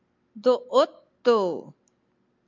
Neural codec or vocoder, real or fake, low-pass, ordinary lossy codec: none; real; 7.2 kHz; MP3, 64 kbps